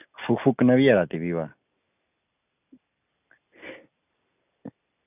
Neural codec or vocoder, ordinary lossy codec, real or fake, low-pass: none; none; real; 3.6 kHz